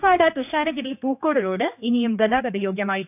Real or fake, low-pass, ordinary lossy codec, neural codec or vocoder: fake; 3.6 kHz; AAC, 32 kbps; codec, 16 kHz, 2 kbps, X-Codec, HuBERT features, trained on general audio